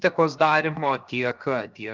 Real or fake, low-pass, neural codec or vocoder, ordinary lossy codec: fake; 7.2 kHz; codec, 16 kHz, 0.7 kbps, FocalCodec; Opus, 24 kbps